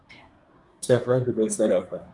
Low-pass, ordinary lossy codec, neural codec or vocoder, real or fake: 10.8 kHz; Opus, 64 kbps; codec, 24 kHz, 1 kbps, SNAC; fake